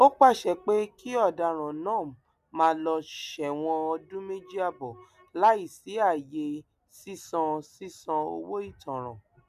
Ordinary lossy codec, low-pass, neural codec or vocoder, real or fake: none; 14.4 kHz; none; real